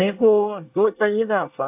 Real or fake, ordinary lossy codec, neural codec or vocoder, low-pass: fake; AAC, 32 kbps; codec, 24 kHz, 1 kbps, SNAC; 3.6 kHz